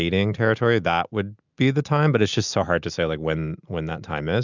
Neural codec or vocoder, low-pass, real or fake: none; 7.2 kHz; real